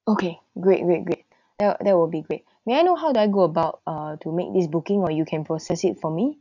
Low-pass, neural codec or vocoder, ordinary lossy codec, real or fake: 7.2 kHz; none; none; real